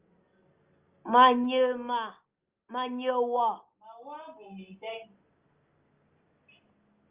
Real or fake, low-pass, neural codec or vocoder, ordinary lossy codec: real; 3.6 kHz; none; Opus, 24 kbps